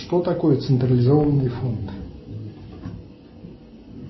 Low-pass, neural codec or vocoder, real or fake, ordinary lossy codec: 7.2 kHz; none; real; MP3, 24 kbps